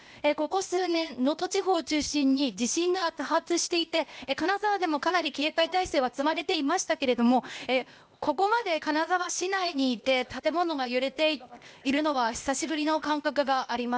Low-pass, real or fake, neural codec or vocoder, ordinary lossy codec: none; fake; codec, 16 kHz, 0.8 kbps, ZipCodec; none